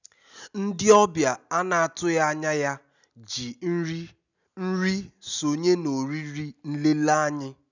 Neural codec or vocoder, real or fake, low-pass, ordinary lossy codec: none; real; 7.2 kHz; none